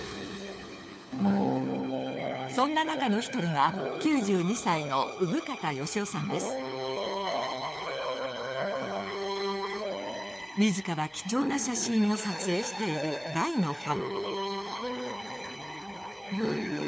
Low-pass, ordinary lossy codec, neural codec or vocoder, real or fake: none; none; codec, 16 kHz, 4 kbps, FunCodec, trained on LibriTTS, 50 frames a second; fake